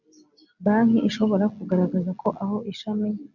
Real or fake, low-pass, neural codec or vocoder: real; 7.2 kHz; none